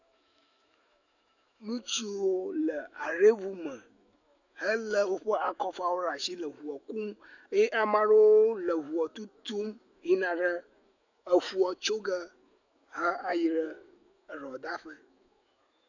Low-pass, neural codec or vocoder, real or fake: 7.2 kHz; autoencoder, 48 kHz, 128 numbers a frame, DAC-VAE, trained on Japanese speech; fake